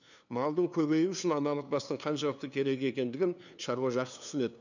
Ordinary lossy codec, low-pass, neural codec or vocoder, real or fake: none; 7.2 kHz; codec, 16 kHz, 2 kbps, FunCodec, trained on LibriTTS, 25 frames a second; fake